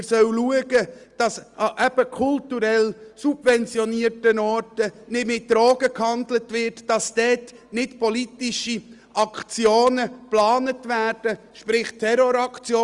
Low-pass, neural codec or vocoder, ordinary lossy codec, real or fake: 10.8 kHz; none; Opus, 32 kbps; real